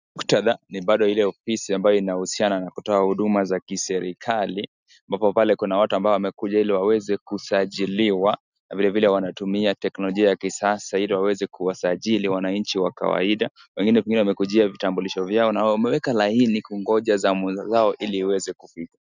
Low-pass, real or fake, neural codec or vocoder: 7.2 kHz; real; none